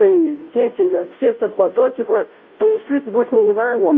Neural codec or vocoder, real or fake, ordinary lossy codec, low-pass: codec, 16 kHz, 0.5 kbps, FunCodec, trained on Chinese and English, 25 frames a second; fake; MP3, 32 kbps; 7.2 kHz